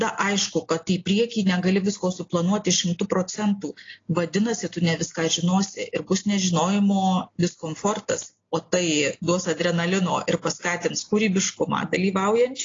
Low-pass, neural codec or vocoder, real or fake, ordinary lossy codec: 7.2 kHz; none; real; AAC, 32 kbps